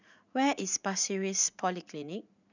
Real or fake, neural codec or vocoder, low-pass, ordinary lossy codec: fake; vocoder, 44.1 kHz, 80 mel bands, Vocos; 7.2 kHz; none